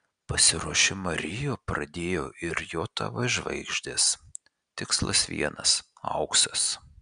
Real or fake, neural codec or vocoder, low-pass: real; none; 9.9 kHz